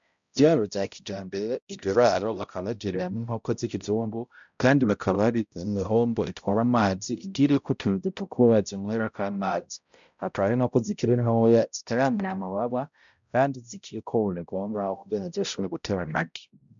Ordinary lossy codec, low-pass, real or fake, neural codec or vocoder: MP3, 64 kbps; 7.2 kHz; fake; codec, 16 kHz, 0.5 kbps, X-Codec, HuBERT features, trained on balanced general audio